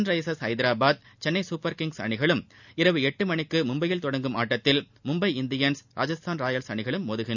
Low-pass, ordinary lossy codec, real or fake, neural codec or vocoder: 7.2 kHz; none; real; none